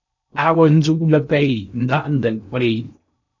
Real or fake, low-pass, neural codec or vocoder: fake; 7.2 kHz; codec, 16 kHz in and 24 kHz out, 0.6 kbps, FocalCodec, streaming, 4096 codes